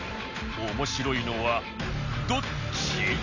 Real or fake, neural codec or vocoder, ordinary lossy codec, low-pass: real; none; none; 7.2 kHz